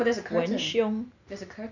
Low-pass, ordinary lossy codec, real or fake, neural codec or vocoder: 7.2 kHz; none; real; none